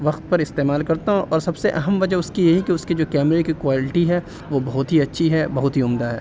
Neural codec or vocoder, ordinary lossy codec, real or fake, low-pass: none; none; real; none